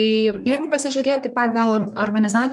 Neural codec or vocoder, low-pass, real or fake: codec, 24 kHz, 1 kbps, SNAC; 10.8 kHz; fake